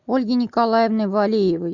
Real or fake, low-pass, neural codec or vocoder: real; 7.2 kHz; none